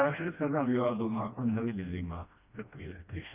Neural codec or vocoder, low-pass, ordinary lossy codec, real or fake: codec, 16 kHz, 1 kbps, FreqCodec, smaller model; 3.6 kHz; none; fake